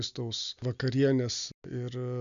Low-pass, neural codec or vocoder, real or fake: 7.2 kHz; none; real